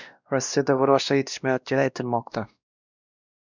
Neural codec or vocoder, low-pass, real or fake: codec, 16 kHz, 1 kbps, X-Codec, WavLM features, trained on Multilingual LibriSpeech; 7.2 kHz; fake